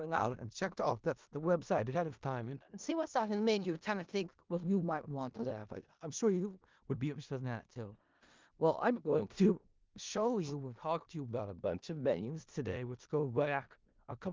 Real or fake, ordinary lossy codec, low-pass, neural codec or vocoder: fake; Opus, 32 kbps; 7.2 kHz; codec, 16 kHz in and 24 kHz out, 0.4 kbps, LongCat-Audio-Codec, four codebook decoder